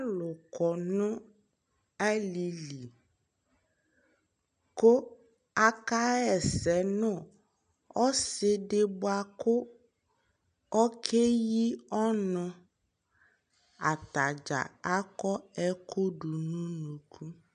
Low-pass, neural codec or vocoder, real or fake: 9.9 kHz; none; real